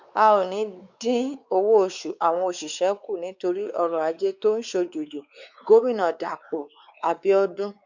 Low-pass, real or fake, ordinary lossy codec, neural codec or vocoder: 7.2 kHz; fake; Opus, 64 kbps; codec, 16 kHz, 4 kbps, X-Codec, WavLM features, trained on Multilingual LibriSpeech